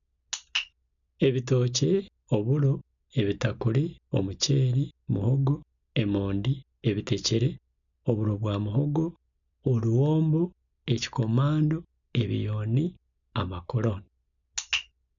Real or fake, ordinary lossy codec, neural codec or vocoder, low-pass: real; none; none; 7.2 kHz